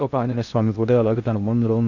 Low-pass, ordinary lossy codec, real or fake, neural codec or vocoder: 7.2 kHz; none; fake; codec, 16 kHz in and 24 kHz out, 0.6 kbps, FocalCodec, streaming, 2048 codes